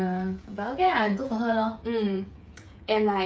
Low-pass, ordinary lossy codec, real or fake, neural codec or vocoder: none; none; fake; codec, 16 kHz, 8 kbps, FreqCodec, smaller model